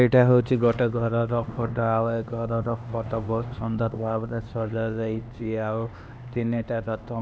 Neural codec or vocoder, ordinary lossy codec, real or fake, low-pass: codec, 16 kHz, 2 kbps, X-Codec, HuBERT features, trained on LibriSpeech; none; fake; none